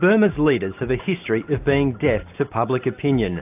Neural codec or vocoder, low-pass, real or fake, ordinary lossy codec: none; 3.6 kHz; real; AAC, 32 kbps